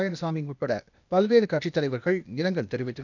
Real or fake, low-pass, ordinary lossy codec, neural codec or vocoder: fake; 7.2 kHz; none; codec, 16 kHz, 0.8 kbps, ZipCodec